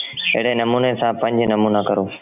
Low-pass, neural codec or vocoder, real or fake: 3.6 kHz; none; real